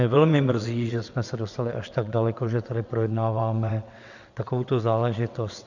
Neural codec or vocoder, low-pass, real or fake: vocoder, 22.05 kHz, 80 mel bands, WaveNeXt; 7.2 kHz; fake